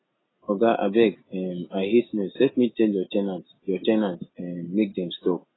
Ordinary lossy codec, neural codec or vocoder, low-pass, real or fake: AAC, 16 kbps; none; 7.2 kHz; real